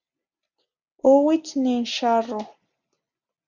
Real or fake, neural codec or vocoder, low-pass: real; none; 7.2 kHz